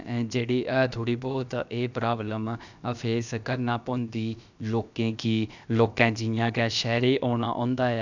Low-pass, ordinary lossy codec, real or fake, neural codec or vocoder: 7.2 kHz; none; fake; codec, 16 kHz, about 1 kbps, DyCAST, with the encoder's durations